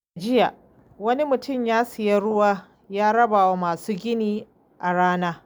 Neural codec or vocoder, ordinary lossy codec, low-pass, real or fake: none; none; none; real